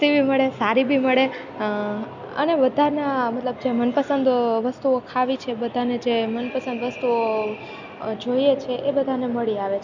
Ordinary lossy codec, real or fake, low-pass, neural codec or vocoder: none; real; 7.2 kHz; none